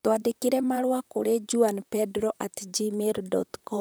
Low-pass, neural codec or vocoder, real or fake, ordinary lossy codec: none; vocoder, 44.1 kHz, 128 mel bands, Pupu-Vocoder; fake; none